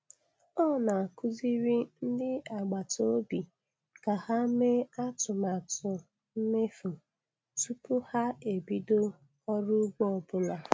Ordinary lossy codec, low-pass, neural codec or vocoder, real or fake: none; none; none; real